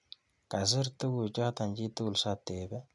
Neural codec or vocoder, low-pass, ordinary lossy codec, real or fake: none; 10.8 kHz; none; real